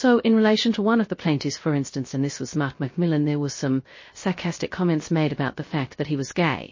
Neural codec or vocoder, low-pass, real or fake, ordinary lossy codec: codec, 16 kHz, 0.3 kbps, FocalCodec; 7.2 kHz; fake; MP3, 32 kbps